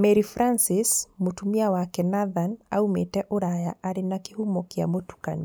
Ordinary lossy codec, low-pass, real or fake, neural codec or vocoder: none; none; real; none